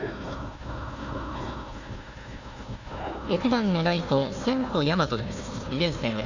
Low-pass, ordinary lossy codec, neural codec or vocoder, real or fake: 7.2 kHz; none; codec, 16 kHz, 1 kbps, FunCodec, trained on Chinese and English, 50 frames a second; fake